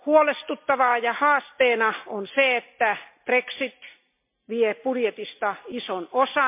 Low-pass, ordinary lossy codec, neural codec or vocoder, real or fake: 3.6 kHz; MP3, 32 kbps; none; real